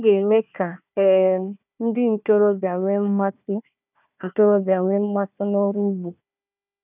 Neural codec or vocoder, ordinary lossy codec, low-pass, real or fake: codec, 16 kHz, 1 kbps, FunCodec, trained on Chinese and English, 50 frames a second; none; 3.6 kHz; fake